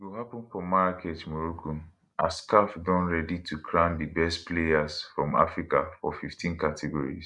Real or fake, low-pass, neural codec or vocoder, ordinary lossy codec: real; 10.8 kHz; none; none